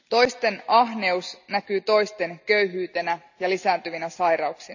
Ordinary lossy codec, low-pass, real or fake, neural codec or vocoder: none; 7.2 kHz; real; none